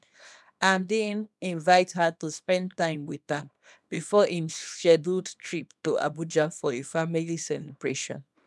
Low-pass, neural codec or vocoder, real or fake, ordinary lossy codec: none; codec, 24 kHz, 0.9 kbps, WavTokenizer, small release; fake; none